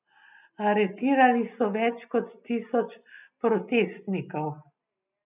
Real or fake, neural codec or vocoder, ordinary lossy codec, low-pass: real; none; none; 3.6 kHz